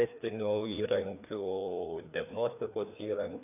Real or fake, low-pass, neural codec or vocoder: fake; 3.6 kHz; codec, 16 kHz, 2 kbps, FreqCodec, larger model